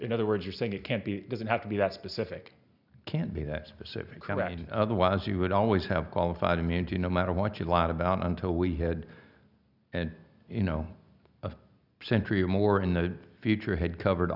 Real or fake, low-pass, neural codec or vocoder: real; 5.4 kHz; none